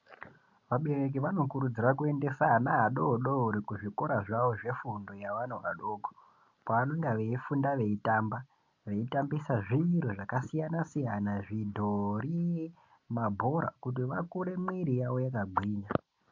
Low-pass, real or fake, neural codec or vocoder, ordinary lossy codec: 7.2 kHz; real; none; MP3, 48 kbps